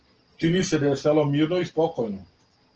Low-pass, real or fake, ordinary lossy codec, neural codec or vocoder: 7.2 kHz; real; Opus, 16 kbps; none